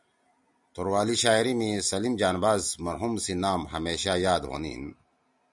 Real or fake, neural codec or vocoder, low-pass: real; none; 10.8 kHz